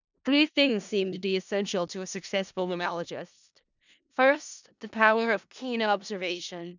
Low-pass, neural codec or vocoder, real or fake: 7.2 kHz; codec, 16 kHz in and 24 kHz out, 0.4 kbps, LongCat-Audio-Codec, four codebook decoder; fake